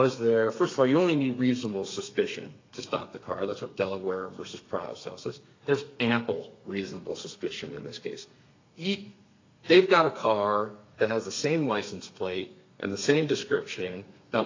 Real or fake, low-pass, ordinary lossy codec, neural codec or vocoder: fake; 7.2 kHz; AAC, 32 kbps; codec, 32 kHz, 1.9 kbps, SNAC